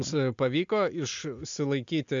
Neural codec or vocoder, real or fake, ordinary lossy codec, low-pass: none; real; MP3, 64 kbps; 7.2 kHz